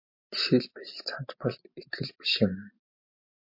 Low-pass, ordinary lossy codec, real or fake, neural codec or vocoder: 5.4 kHz; MP3, 32 kbps; fake; vocoder, 44.1 kHz, 128 mel bands every 512 samples, BigVGAN v2